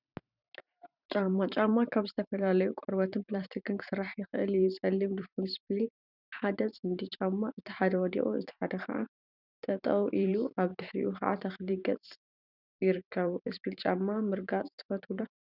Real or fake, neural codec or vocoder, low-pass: fake; vocoder, 44.1 kHz, 128 mel bands every 512 samples, BigVGAN v2; 5.4 kHz